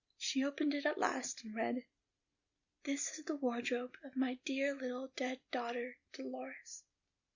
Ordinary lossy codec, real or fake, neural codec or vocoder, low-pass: Opus, 64 kbps; real; none; 7.2 kHz